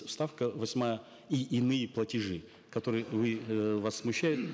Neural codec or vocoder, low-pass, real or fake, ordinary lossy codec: none; none; real; none